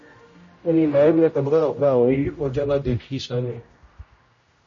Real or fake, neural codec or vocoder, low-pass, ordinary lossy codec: fake; codec, 16 kHz, 0.5 kbps, X-Codec, HuBERT features, trained on general audio; 7.2 kHz; MP3, 32 kbps